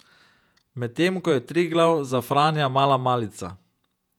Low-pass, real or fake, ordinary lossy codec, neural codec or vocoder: 19.8 kHz; fake; none; vocoder, 48 kHz, 128 mel bands, Vocos